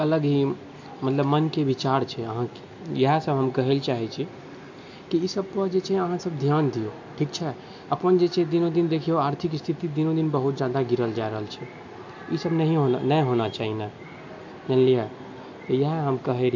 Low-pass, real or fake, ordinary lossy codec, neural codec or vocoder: 7.2 kHz; real; MP3, 48 kbps; none